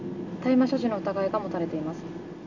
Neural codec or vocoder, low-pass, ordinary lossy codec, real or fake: none; 7.2 kHz; none; real